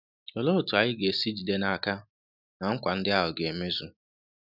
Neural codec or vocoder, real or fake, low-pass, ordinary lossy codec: none; real; 5.4 kHz; none